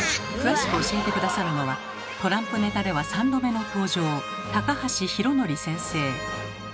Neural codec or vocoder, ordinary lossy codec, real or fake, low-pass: none; none; real; none